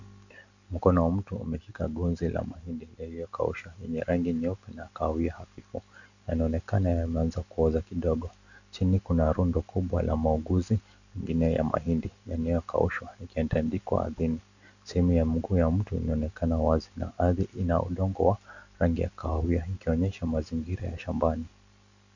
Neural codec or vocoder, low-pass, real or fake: none; 7.2 kHz; real